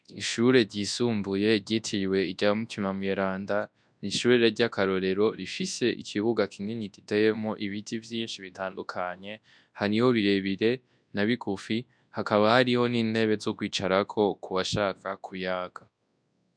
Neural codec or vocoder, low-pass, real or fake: codec, 24 kHz, 0.9 kbps, WavTokenizer, large speech release; 9.9 kHz; fake